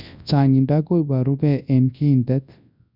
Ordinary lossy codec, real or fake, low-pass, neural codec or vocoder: none; fake; 5.4 kHz; codec, 24 kHz, 0.9 kbps, WavTokenizer, large speech release